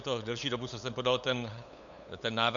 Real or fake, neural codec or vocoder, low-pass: fake; codec, 16 kHz, 8 kbps, FunCodec, trained on LibriTTS, 25 frames a second; 7.2 kHz